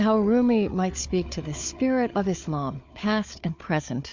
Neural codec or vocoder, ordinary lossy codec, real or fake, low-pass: codec, 16 kHz, 4 kbps, FunCodec, trained on Chinese and English, 50 frames a second; MP3, 64 kbps; fake; 7.2 kHz